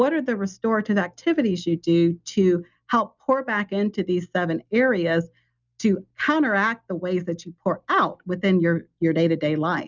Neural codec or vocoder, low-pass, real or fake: none; 7.2 kHz; real